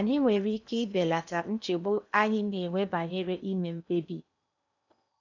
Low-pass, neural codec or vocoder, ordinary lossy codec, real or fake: 7.2 kHz; codec, 16 kHz in and 24 kHz out, 0.8 kbps, FocalCodec, streaming, 65536 codes; none; fake